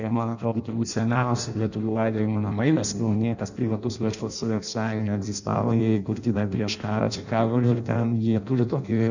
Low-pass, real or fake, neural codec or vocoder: 7.2 kHz; fake; codec, 16 kHz in and 24 kHz out, 0.6 kbps, FireRedTTS-2 codec